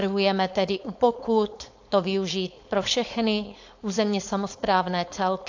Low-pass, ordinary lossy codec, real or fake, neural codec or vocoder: 7.2 kHz; AAC, 48 kbps; fake; codec, 16 kHz, 4.8 kbps, FACodec